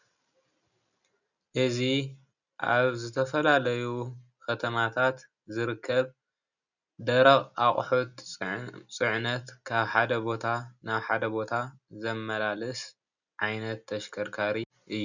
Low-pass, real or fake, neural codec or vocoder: 7.2 kHz; real; none